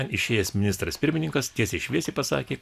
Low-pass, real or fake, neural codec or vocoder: 14.4 kHz; real; none